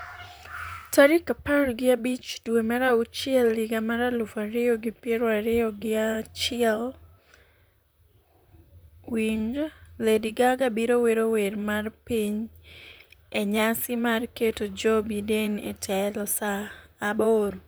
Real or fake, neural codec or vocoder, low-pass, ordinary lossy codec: fake; vocoder, 44.1 kHz, 128 mel bands, Pupu-Vocoder; none; none